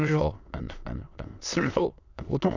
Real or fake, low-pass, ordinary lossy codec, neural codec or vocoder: fake; 7.2 kHz; none; autoencoder, 22.05 kHz, a latent of 192 numbers a frame, VITS, trained on many speakers